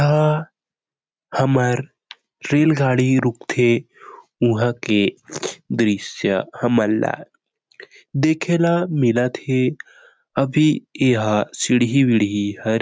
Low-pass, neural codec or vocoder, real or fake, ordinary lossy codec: none; none; real; none